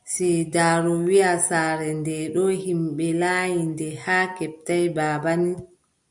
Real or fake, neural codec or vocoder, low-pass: real; none; 10.8 kHz